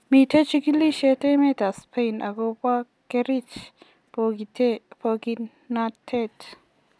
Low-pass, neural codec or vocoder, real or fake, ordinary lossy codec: none; none; real; none